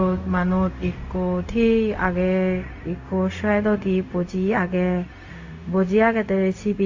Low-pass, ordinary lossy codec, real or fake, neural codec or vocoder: 7.2 kHz; AAC, 48 kbps; fake; codec, 16 kHz, 0.4 kbps, LongCat-Audio-Codec